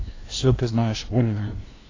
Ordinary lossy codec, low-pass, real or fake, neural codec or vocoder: AAC, 32 kbps; 7.2 kHz; fake; codec, 16 kHz, 0.5 kbps, FunCodec, trained on LibriTTS, 25 frames a second